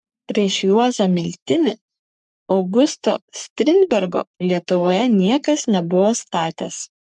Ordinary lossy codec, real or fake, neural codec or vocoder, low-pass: MP3, 96 kbps; fake; codec, 44.1 kHz, 3.4 kbps, Pupu-Codec; 10.8 kHz